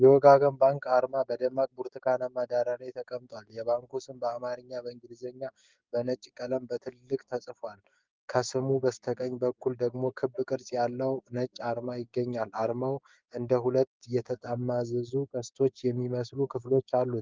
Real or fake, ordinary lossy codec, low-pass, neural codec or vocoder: fake; Opus, 32 kbps; 7.2 kHz; codec, 16 kHz, 6 kbps, DAC